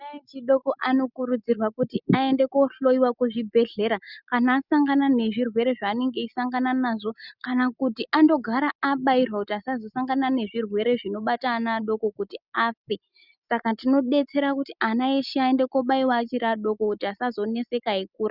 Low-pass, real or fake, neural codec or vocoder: 5.4 kHz; real; none